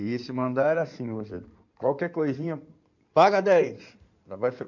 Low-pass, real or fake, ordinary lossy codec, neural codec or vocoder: 7.2 kHz; fake; none; codec, 16 kHz in and 24 kHz out, 2.2 kbps, FireRedTTS-2 codec